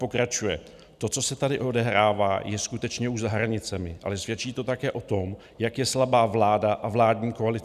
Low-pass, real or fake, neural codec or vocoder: 14.4 kHz; real; none